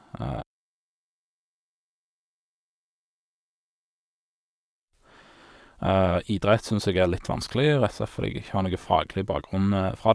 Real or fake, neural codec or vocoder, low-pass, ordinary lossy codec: fake; vocoder, 22.05 kHz, 80 mel bands, WaveNeXt; none; none